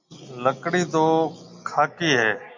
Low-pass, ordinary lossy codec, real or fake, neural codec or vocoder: 7.2 kHz; MP3, 64 kbps; real; none